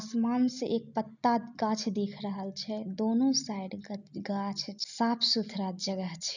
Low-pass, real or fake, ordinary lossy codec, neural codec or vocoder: 7.2 kHz; real; none; none